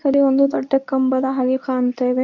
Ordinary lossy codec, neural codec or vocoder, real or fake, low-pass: none; codec, 24 kHz, 0.9 kbps, WavTokenizer, medium speech release version 2; fake; 7.2 kHz